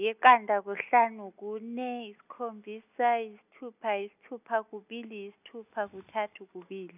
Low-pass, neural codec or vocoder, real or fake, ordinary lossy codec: 3.6 kHz; none; real; none